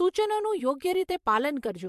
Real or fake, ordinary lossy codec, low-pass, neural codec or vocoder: fake; MP3, 64 kbps; 14.4 kHz; vocoder, 44.1 kHz, 128 mel bands every 512 samples, BigVGAN v2